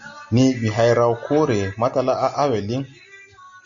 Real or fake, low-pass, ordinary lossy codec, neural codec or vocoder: real; 7.2 kHz; Opus, 64 kbps; none